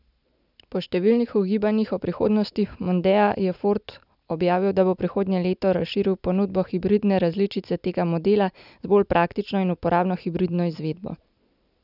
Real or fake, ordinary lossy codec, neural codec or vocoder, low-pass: real; none; none; 5.4 kHz